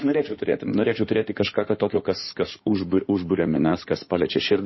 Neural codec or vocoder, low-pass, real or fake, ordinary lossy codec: codec, 16 kHz in and 24 kHz out, 2.2 kbps, FireRedTTS-2 codec; 7.2 kHz; fake; MP3, 24 kbps